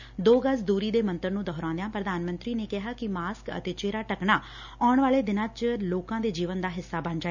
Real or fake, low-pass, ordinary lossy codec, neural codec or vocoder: real; 7.2 kHz; none; none